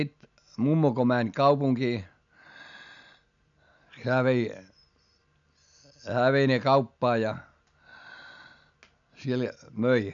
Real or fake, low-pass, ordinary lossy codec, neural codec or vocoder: real; 7.2 kHz; none; none